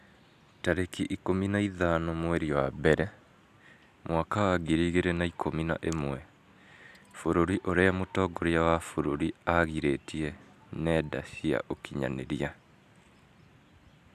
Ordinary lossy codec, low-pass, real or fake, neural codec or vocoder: none; 14.4 kHz; real; none